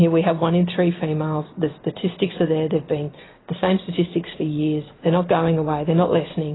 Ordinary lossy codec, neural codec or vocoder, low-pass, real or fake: AAC, 16 kbps; none; 7.2 kHz; real